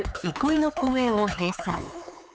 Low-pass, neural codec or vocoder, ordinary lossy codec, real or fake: none; codec, 16 kHz, 4 kbps, X-Codec, HuBERT features, trained on LibriSpeech; none; fake